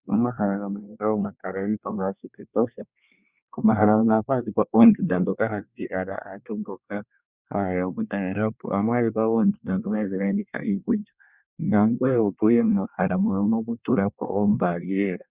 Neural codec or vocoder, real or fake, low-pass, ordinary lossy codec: codec, 24 kHz, 1 kbps, SNAC; fake; 3.6 kHz; Opus, 64 kbps